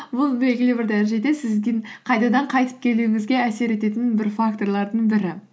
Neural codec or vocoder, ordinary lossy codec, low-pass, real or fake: none; none; none; real